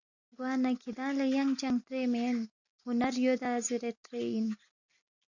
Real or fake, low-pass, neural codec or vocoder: real; 7.2 kHz; none